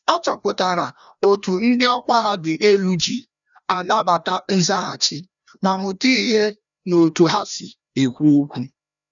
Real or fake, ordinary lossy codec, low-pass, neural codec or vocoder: fake; none; 7.2 kHz; codec, 16 kHz, 1 kbps, FreqCodec, larger model